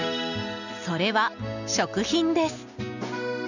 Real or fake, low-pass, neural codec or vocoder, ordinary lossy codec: real; 7.2 kHz; none; none